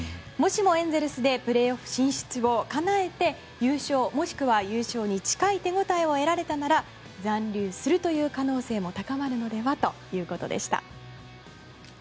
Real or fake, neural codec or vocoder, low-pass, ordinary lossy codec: real; none; none; none